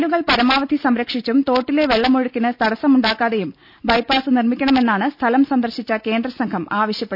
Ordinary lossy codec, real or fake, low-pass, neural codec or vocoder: none; real; 5.4 kHz; none